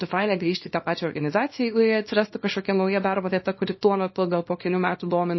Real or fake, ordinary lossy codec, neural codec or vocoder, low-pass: fake; MP3, 24 kbps; codec, 24 kHz, 0.9 kbps, WavTokenizer, small release; 7.2 kHz